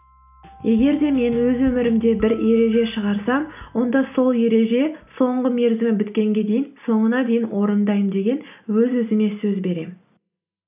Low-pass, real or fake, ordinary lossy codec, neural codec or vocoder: 3.6 kHz; real; none; none